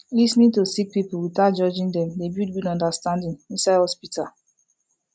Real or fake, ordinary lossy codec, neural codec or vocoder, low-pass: real; none; none; none